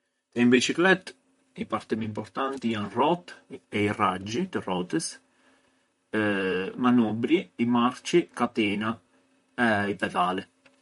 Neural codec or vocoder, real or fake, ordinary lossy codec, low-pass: vocoder, 44.1 kHz, 128 mel bands, Pupu-Vocoder; fake; MP3, 48 kbps; 19.8 kHz